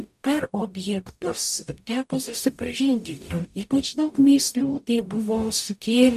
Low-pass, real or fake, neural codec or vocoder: 14.4 kHz; fake; codec, 44.1 kHz, 0.9 kbps, DAC